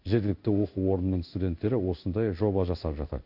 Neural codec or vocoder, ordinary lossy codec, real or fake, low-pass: codec, 16 kHz in and 24 kHz out, 1 kbps, XY-Tokenizer; none; fake; 5.4 kHz